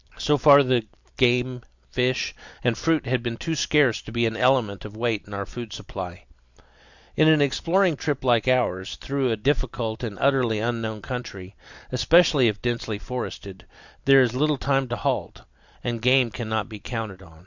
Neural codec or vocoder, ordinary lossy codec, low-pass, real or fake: none; Opus, 64 kbps; 7.2 kHz; real